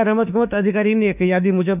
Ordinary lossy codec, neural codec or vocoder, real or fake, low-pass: none; autoencoder, 48 kHz, 32 numbers a frame, DAC-VAE, trained on Japanese speech; fake; 3.6 kHz